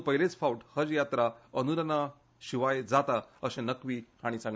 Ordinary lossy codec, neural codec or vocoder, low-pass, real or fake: none; none; none; real